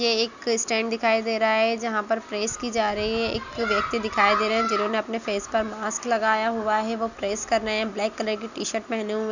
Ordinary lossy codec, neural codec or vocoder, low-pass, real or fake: none; none; 7.2 kHz; real